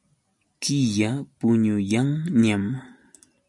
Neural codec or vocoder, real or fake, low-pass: none; real; 10.8 kHz